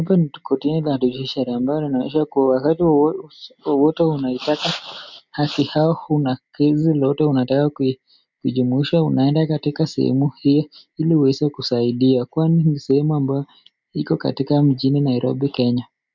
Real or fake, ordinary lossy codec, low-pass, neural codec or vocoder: real; MP3, 64 kbps; 7.2 kHz; none